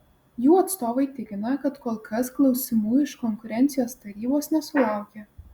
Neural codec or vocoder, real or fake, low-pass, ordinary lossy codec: none; real; 19.8 kHz; Opus, 64 kbps